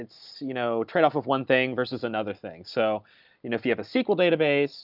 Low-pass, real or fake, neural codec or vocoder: 5.4 kHz; real; none